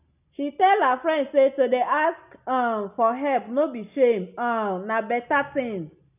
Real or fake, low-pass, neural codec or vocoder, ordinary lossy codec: real; 3.6 kHz; none; none